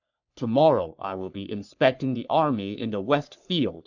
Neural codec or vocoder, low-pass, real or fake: codec, 44.1 kHz, 3.4 kbps, Pupu-Codec; 7.2 kHz; fake